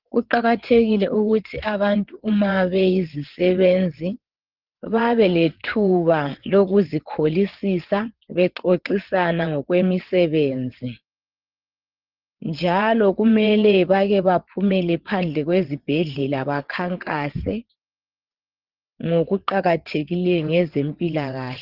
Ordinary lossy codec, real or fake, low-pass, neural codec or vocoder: Opus, 16 kbps; fake; 5.4 kHz; vocoder, 22.05 kHz, 80 mel bands, WaveNeXt